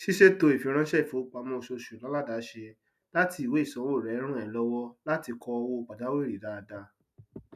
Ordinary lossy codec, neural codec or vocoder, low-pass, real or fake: none; none; 14.4 kHz; real